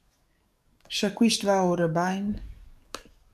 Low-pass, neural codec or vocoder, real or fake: 14.4 kHz; codec, 44.1 kHz, 7.8 kbps, DAC; fake